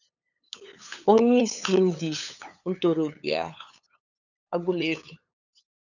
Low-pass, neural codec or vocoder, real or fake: 7.2 kHz; codec, 16 kHz, 8 kbps, FunCodec, trained on LibriTTS, 25 frames a second; fake